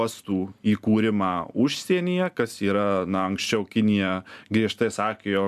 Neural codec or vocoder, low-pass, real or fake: none; 14.4 kHz; real